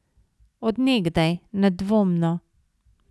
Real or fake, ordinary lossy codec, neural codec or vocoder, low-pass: real; none; none; none